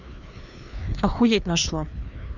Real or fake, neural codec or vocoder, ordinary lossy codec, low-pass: fake; codec, 16 kHz, 2 kbps, FreqCodec, larger model; none; 7.2 kHz